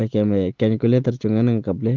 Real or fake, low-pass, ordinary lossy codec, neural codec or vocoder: real; 7.2 kHz; Opus, 16 kbps; none